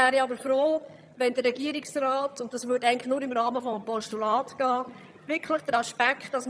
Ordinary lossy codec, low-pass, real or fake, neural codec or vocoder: none; none; fake; vocoder, 22.05 kHz, 80 mel bands, HiFi-GAN